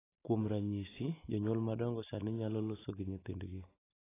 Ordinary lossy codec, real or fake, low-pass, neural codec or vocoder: AAC, 16 kbps; real; 3.6 kHz; none